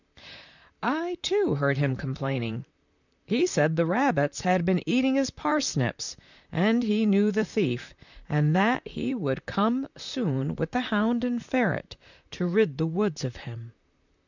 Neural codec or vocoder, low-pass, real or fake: vocoder, 44.1 kHz, 128 mel bands, Pupu-Vocoder; 7.2 kHz; fake